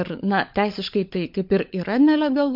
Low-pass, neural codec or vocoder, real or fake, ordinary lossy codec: 5.4 kHz; codec, 16 kHz, 16 kbps, FunCodec, trained on LibriTTS, 50 frames a second; fake; MP3, 48 kbps